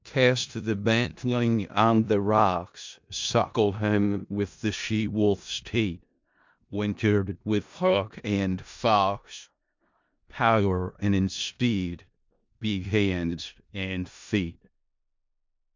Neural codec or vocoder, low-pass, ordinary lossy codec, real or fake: codec, 16 kHz in and 24 kHz out, 0.4 kbps, LongCat-Audio-Codec, four codebook decoder; 7.2 kHz; MP3, 64 kbps; fake